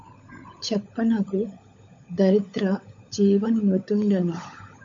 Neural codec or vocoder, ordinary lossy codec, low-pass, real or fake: codec, 16 kHz, 16 kbps, FunCodec, trained on LibriTTS, 50 frames a second; MP3, 48 kbps; 7.2 kHz; fake